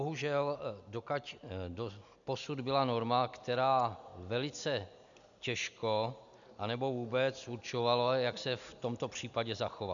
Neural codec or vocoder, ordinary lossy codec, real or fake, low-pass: none; MP3, 96 kbps; real; 7.2 kHz